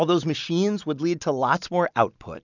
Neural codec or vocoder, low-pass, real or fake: none; 7.2 kHz; real